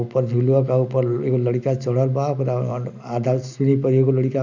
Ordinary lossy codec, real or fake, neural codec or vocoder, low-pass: none; real; none; 7.2 kHz